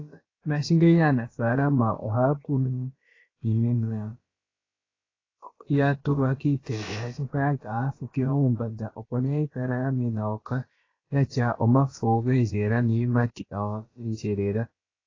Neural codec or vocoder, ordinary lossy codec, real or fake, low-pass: codec, 16 kHz, about 1 kbps, DyCAST, with the encoder's durations; AAC, 32 kbps; fake; 7.2 kHz